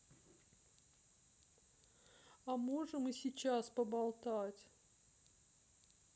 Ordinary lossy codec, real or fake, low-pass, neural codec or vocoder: none; real; none; none